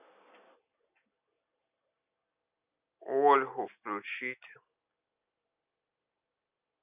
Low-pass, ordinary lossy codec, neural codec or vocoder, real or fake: 3.6 kHz; none; none; real